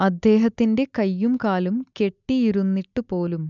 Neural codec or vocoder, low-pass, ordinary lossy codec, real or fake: none; 7.2 kHz; none; real